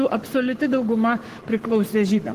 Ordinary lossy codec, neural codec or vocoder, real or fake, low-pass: Opus, 16 kbps; codec, 44.1 kHz, 7.8 kbps, Pupu-Codec; fake; 14.4 kHz